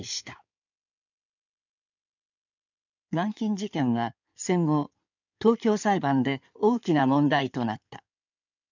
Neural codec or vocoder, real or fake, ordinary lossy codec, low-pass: codec, 16 kHz in and 24 kHz out, 2.2 kbps, FireRedTTS-2 codec; fake; none; 7.2 kHz